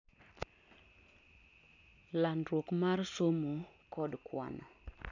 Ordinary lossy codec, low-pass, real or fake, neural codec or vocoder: none; 7.2 kHz; real; none